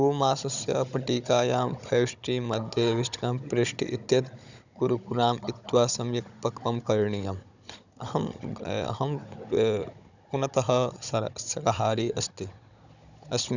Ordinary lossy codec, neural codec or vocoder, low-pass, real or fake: none; codec, 16 kHz, 16 kbps, FunCodec, trained on Chinese and English, 50 frames a second; 7.2 kHz; fake